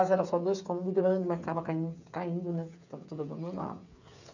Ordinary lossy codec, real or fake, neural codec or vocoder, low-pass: none; fake; codec, 16 kHz, 8 kbps, FreqCodec, smaller model; 7.2 kHz